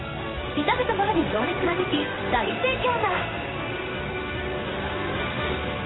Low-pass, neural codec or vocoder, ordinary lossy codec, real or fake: 7.2 kHz; codec, 16 kHz in and 24 kHz out, 1 kbps, XY-Tokenizer; AAC, 16 kbps; fake